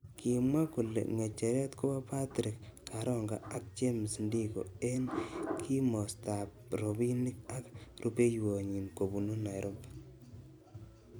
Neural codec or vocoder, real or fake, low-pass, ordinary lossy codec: vocoder, 44.1 kHz, 128 mel bands every 256 samples, BigVGAN v2; fake; none; none